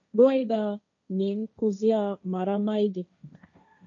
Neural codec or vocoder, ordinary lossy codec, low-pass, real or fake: codec, 16 kHz, 1.1 kbps, Voila-Tokenizer; MP3, 64 kbps; 7.2 kHz; fake